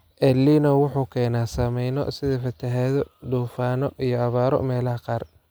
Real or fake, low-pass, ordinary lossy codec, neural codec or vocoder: real; none; none; none